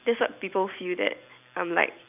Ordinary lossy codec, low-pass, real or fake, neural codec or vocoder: none; 3.6 kHz; fake; vocoder, 44.1 kHz, 128 mel bands every 256 samples, BigVGAN v2